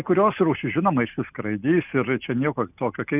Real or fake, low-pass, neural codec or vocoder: real; 3.6 kHz; none